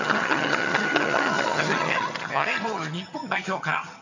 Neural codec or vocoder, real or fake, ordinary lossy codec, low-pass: vocoder, 22.05 kHz, 80 mel bands, HiFi-GAN; fake; none; 7.2 kHz